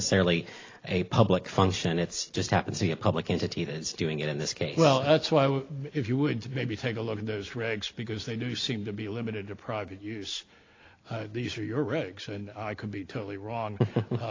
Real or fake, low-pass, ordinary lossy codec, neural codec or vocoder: real; 7.2 kHz; AAC, 32 kbps; none